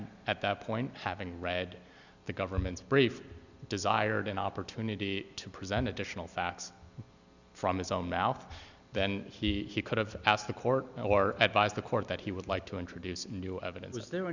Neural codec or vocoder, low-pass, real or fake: none; 7.2 kHz; real